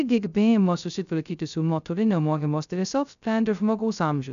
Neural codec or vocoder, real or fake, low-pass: codec, 16 kHz, 0.2 kbps, FocalCodec; fake; 7.2 kHz